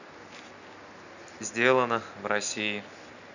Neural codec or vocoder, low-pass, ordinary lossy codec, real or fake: none; 7.2 kHz; none; real